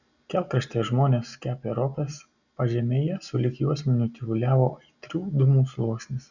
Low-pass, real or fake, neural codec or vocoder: 7.2 kHz; real; none